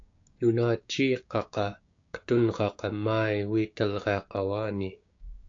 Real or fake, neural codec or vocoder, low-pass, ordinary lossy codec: fake; codec, 16 kHz, 6 kbps, DAC; 7.2 kHz; AAC, 48 kbps